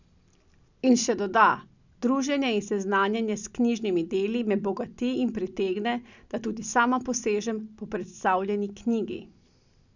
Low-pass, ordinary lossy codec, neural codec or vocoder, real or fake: 7.2 kHz; none; none; real